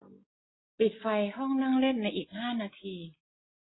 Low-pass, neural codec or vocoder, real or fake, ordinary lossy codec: 7.2 kHz; none; real; AAC, 16 kbps